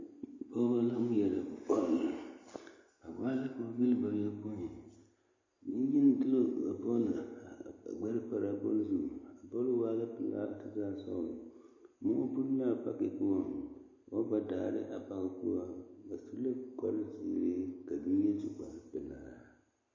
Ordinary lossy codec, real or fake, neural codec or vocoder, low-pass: MP3, 32 kbps; real; none; 7.2 kHz